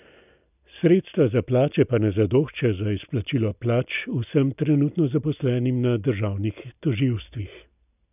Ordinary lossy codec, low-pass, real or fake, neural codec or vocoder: none; 3.6 kHz; real; none